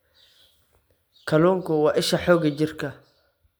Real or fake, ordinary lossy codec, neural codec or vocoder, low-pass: real; none; none; none